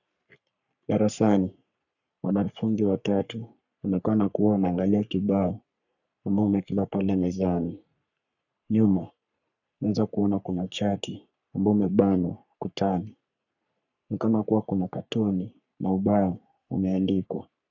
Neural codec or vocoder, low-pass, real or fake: codec, 44.1 kHz, 3.4 kbps, Pupu-Codec; 7.2 kHz; fake